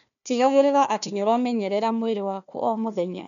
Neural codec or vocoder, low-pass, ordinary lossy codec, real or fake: codec, 16 kHz, 1 kbps, FunCodec, trained on Chinese and English, 50 frames a second; 7.2 kHz; none; fake